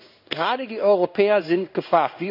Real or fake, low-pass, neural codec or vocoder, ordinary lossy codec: fake; 5.4 kHz; codec, 16 kHz in and 24 kHz out, 1 kbps, XY-Tokenizer; none